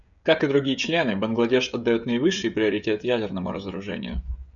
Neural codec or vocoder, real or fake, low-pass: codec, 16 kHz, 16 kbps, FreqCodec, smaller model; fake; 7.2 kHz